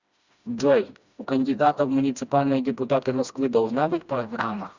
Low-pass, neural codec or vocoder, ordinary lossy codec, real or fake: 7.2 kHz; codec, 16 kHz, 1 kbps, FreqCodec, smaller model; Opus, 64 kbps; fake